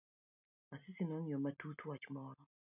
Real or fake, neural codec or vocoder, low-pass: real; none; 3.6 kHz